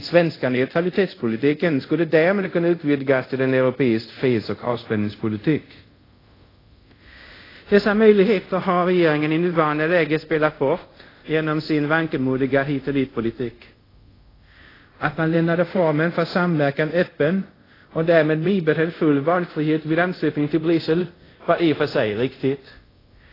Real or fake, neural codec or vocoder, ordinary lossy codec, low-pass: fake; codec, 24 kHz, 0.5 kbps, DualCodec; AAC, 24 kbps; 5.4 kHz